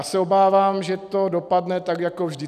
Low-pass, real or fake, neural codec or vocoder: 14.4 kHz; real; none